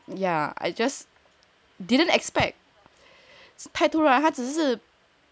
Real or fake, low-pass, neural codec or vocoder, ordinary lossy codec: real; none; none; none